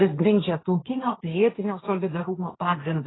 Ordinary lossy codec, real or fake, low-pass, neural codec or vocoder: AAC, 16 kbps; fake; 7.2 kHz; codec, 16 kHz, 1.1 kbps, Voila-Tokenizer